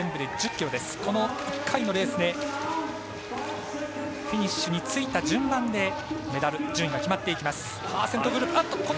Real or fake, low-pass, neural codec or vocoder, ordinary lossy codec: real; none; none; none